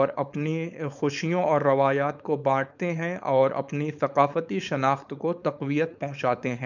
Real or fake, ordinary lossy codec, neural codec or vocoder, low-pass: fake; none; codec, 16 kHz, 4.8 kbps, FACodec; 7.2 kHz